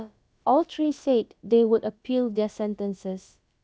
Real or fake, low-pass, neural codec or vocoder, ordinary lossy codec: fake; none; codec, 16 kHz, about 1 kbps, DyCAST, with the encoder's durations; none